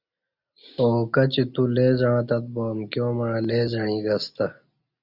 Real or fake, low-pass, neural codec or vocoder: real; 5.4 kHz; none